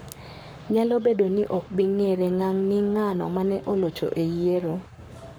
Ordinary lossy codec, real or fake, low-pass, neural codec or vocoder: none; fake; none; codec, 44.1 kHz, 7.8 kbps, Pupu-Codec